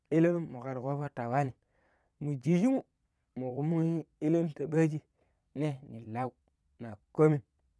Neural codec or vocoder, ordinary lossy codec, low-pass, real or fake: vocoder, 22.05 kHz, 80 mel bands, Vocos; none; none; fake